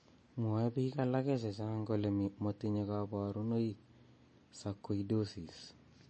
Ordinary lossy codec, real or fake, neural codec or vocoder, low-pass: MP3, 32 kbps; real; none; 10.8 kHz